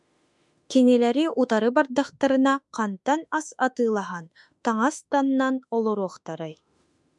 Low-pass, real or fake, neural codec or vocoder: 10.8 kHz; fake; autoencoder, 48 kHz, 32 numbers a frame, DAC-VAE, trained on Japanese speech